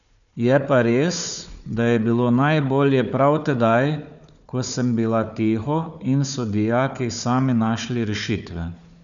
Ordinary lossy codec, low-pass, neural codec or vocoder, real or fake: none; 7.2 kHz; codec, 16 kHz, 4 kbps, FunCodec, trained on Chinese and English, 50 frames a second; fake